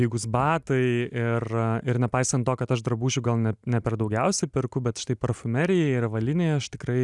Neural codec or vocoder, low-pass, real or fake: none; 10.8 kHz; real